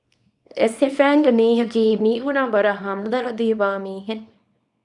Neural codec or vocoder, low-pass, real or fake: codec, 24 kHz, 0.9 kbps, WavTokenizer, small release; 10.8 kHz; fake